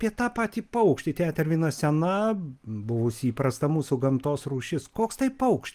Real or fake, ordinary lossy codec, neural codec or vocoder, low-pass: real; Opus, 24 kbps; none; 14.4 kHz